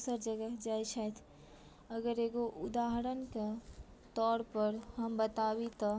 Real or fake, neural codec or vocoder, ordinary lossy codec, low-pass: real; none; none; none